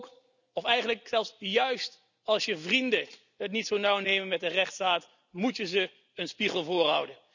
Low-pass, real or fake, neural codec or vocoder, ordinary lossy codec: 7.2 kHz; real; none; none